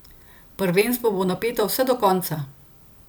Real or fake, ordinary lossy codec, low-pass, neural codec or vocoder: fake; none; none; vocoder, 44.1 kHz, 128 mel bands every 256 samples, BigVGAN v2